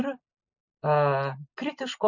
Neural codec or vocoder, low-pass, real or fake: none; 7.2 kHz; real